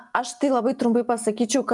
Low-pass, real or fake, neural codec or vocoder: 10.8 kHz; real; none